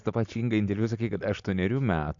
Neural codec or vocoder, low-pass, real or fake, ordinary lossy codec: none; 7.2 kHz; real; MP3, 64 kbps